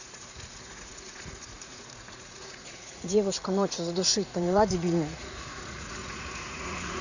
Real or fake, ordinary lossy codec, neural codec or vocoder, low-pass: fake; none; vocoder, 44.1 kHz, 80 mel bands, Vocos; 7.2 kHz